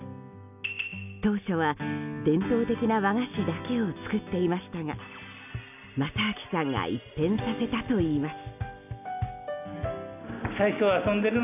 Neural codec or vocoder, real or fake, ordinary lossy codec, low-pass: none; real; none; 3.6 kHz